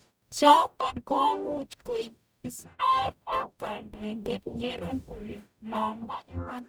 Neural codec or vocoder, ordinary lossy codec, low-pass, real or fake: codec, 44.1 kHz, 0.9 kbps, DAC; none; none; fake